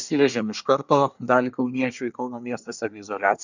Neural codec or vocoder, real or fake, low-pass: codec, 24 kHz, 1 kbps, SNAC; fake; 7.2 kHz